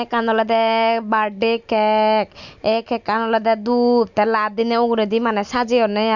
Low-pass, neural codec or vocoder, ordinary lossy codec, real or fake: 7.2 kHz; none; none; real